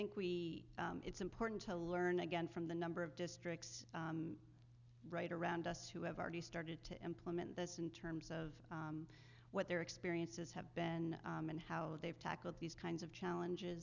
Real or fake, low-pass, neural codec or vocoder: real; 7.2 kHz; none